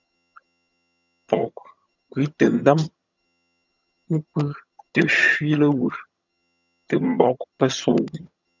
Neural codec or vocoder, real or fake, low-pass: vocoder, 22.05 kHz, 80 mel bands, HiFi-GAN; fake; 7.2 kHz